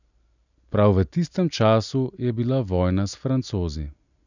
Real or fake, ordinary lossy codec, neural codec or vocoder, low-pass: real; none; none; 7.2 kHz